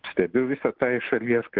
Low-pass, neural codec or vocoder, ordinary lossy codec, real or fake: 5.4 kHz; none; Opus, 16 kbps; real